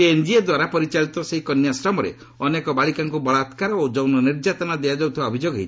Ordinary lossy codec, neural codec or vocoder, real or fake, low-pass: none; none; real; none